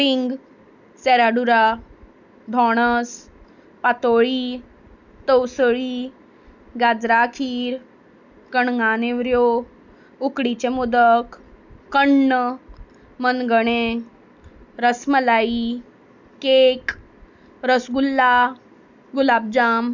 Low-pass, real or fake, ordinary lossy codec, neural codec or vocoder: 7.2 kHz; real; none; none